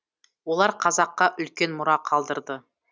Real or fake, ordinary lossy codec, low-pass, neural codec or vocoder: real; none; none; none